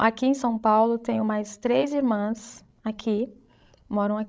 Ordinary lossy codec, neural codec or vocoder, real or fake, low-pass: none; codec, 16 kHz, 16 kbps, FreqCodec, larger model; fake; none